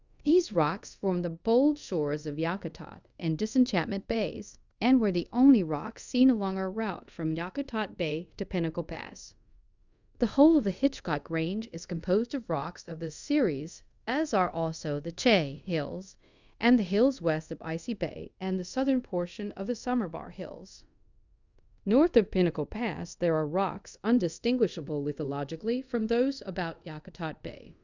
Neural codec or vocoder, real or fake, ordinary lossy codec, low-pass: codec, 24 kHz, 0.5 kbps, DualCodec; fake; Opus, 64 kbps; 7.2 kHz